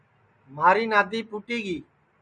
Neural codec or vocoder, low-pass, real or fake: none; 9.9 kHz; real